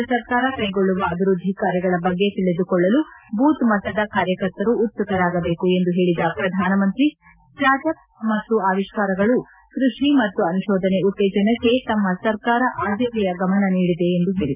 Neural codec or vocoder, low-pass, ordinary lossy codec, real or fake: none; 3.6 kHz; none; real